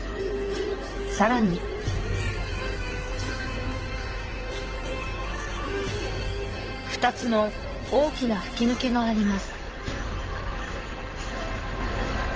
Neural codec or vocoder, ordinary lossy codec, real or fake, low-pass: codec, 16 kHz in and 24 kHz out, 2.2 kbps, FireRedTTS-2 codec; Opus, 16 kbps; fake; 7.2 kHz